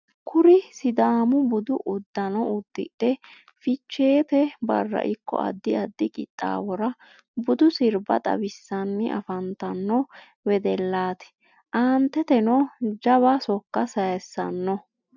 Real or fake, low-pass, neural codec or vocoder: real; 7.2 kHz; none